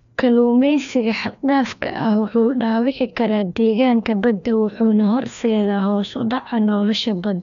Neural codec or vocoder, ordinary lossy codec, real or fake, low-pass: codec, 16 kHz, 1 kbps, FreqCodec, larger model; none; fake; 7.2 kHz